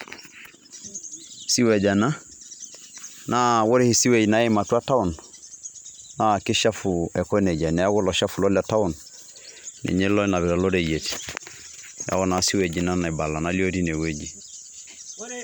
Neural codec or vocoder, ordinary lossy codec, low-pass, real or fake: none; none; none; real